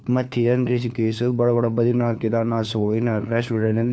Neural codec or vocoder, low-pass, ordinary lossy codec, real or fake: codec, 16 kHz, 4 kbps, FunCodec, trained on LibriTTS, 50 frames a second; none; none; fake